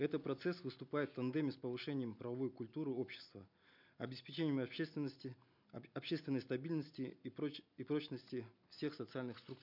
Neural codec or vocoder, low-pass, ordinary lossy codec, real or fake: none; 5.4 kHz; none; real